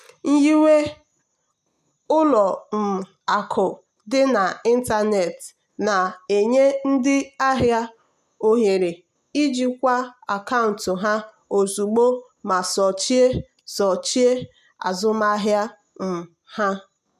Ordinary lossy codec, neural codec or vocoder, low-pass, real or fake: none; none; 14.4 kHz; real